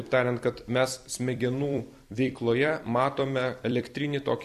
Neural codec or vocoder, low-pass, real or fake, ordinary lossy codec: vocoder, 44.1 kHz, 128 mel bands every 256 samples, BigVGAN v2; 14.4 kHz; fake; AAC, 96 kbps